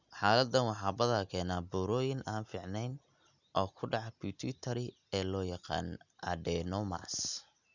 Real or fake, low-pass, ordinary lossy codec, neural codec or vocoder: real; 7.2 kHz; none; none